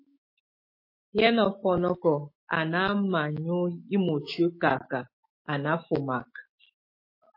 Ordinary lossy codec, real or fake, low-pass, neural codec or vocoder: MP3, 24 kbps; fake; 5.4 kHz; autoencoder, 48 kHz, 128 numbers a frame, DAC-VAE, trained on Japanese speech